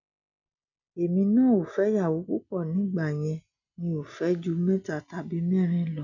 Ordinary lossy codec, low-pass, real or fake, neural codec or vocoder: none; 7.2 kHz; real; none